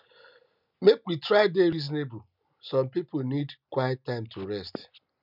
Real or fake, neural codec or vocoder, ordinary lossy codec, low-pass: real; none; none; 5.4 kHz